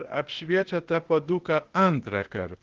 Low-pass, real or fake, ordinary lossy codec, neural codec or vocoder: 7.2 kHz; fake; Opus, 16 kbps; codec, 16 kHz, 0.8 kbps, ZipCodec